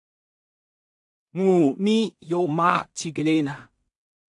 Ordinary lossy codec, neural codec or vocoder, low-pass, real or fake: AAC, 64 kbps; codec, 16 kHz in and 24 kHz out, 0.4 kbps, LongCat-Audio-Codec, two codebook decoder; 10.8 kHz; fake